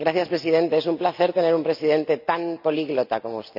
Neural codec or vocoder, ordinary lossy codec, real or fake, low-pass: none; none; real; 5.4 kHz